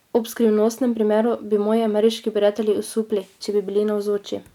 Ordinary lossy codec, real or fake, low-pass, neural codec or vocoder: none; real; 19.8 kHz; none